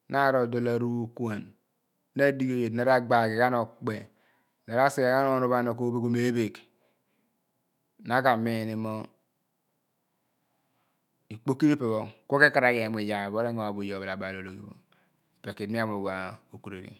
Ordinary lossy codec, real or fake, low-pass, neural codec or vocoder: none; fake; 19.8 kHz; autoencoder, 48 kHz, 128 numbers a frame, DAC-VAE, trained on Japanese speech